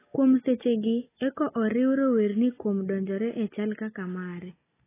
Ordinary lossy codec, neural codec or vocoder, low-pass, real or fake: AAC, 16 kbps; none; 3.6 kHz; real